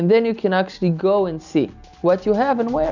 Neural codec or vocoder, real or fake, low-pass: none; real; 7.2 kHz